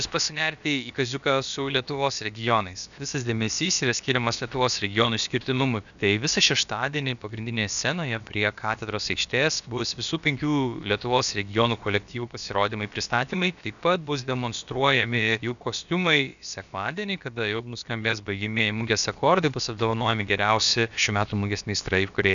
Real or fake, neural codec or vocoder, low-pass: fake; codec, 16 kHz, about 1 kbps, DyCAST, with the encoder's durations; 7.2 kHz